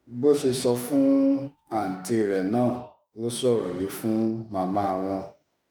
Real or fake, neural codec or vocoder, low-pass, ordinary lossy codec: fake; autoencoder, 48 kHz, 32 numbers a frame, DAC-VAE, trained on Japanese speech; none; none